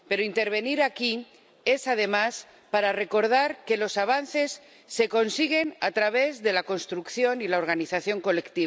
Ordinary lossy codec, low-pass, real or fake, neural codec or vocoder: none; none; real; none